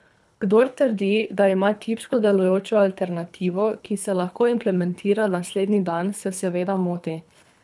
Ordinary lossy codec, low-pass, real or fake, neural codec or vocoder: none; none; fake; codec, 24 kHz, 3 kbps, HILCodec